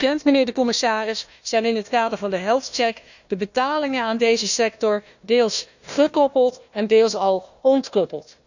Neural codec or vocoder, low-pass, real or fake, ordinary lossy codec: codec, 16 kHz, 1 kbps, FunCodec, trained on Chinese and English, 50 frames a second; 7.2 kHz; fake; none